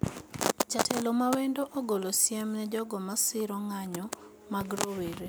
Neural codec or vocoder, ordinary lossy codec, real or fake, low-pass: none; none; real; none